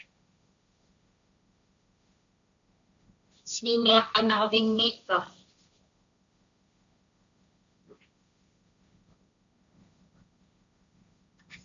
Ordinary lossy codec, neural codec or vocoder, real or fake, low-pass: MP3, 64 kbps; codec, 16 kHz, 1.1 kbps, Voila-Tokenizer; fake; 7.2 kHz